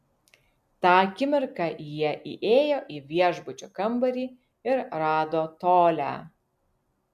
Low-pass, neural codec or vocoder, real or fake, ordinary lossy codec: 14.4 kHz; none; real; MP3, 96 kbps